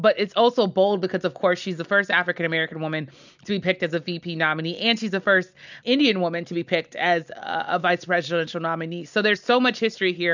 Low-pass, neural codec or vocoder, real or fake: 7.2 kHz; none; real